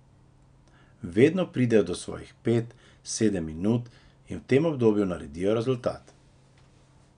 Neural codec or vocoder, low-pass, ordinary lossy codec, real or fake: none; 9.9 kHz; none; real